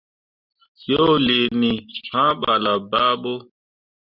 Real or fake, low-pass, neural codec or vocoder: real; 5.4 kHz; none